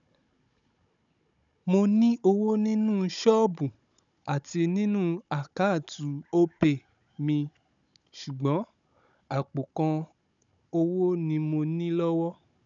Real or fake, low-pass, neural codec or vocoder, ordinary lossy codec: fake; 7.2 kHz; codec, 16 kHz, 16 kbps, FunCodec, trained on Chinese and English, 50 frames a second; none